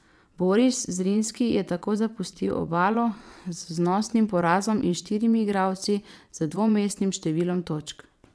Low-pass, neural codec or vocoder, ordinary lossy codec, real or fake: none; vocoder, 22.05 kHz, 80 mel bands, WaveNeXt; none; fake